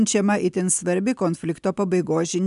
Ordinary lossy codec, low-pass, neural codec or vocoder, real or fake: MP3, 96 kbps; 10.8 kHz; none; real